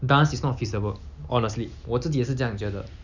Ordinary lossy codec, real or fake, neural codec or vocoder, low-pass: none; real; none; 7.2 kHz